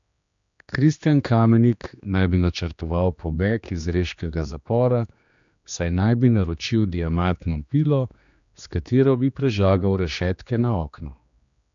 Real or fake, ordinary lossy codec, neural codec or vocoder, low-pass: fake; MP3, 64 kbps; codec, 16 kHz, 2 kbps, X-Codec, HuBERT features, trained on general audio; 7.2 kHz